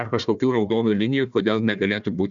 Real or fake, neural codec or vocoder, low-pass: fake; codec, 16 kHz, 1 kbps, FunCodec, trained on Chinese and English, 50 frames a second; 7.2 kHz